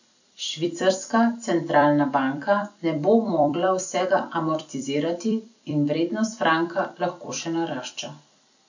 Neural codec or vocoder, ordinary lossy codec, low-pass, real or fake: vocoder, 44.1 kHz, 128 mel bands every 256 samples, BigVGAN v2; none; 7.2 kHz; fake